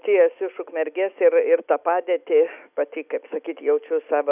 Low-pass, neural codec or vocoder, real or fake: 3.6 kHz; none; real